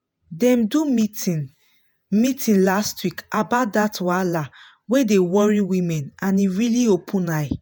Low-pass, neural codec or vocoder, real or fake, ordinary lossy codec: none; vocoder, 48 kHz, 128 mel bands, Vocos; fake; none